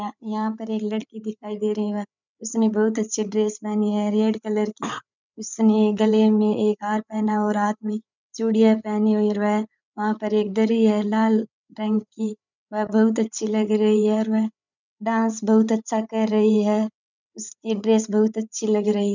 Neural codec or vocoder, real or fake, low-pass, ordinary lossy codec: codec, 16 kHz, 8 kbps, FreqCodec, larger model; fake; 7.2 kHz; none